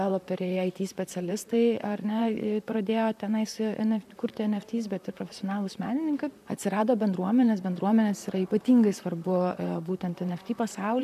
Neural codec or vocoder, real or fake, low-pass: vocoder, 44.1 kHz, 128 mel bands, Pupu-Vocoder; fake; 14.4 kHz